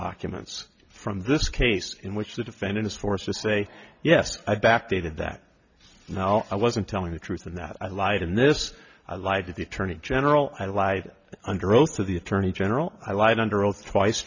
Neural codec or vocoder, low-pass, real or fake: none; 7.2 kHz; real